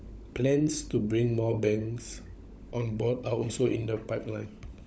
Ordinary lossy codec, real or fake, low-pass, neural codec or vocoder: none; fake; none; codec, 16 kHz, 16 kbps, FunCodec, trained on LibriTTS, 50 frames a second